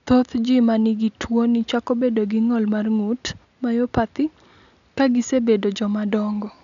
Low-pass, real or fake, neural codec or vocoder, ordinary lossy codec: 7.2 kHz; real; none; none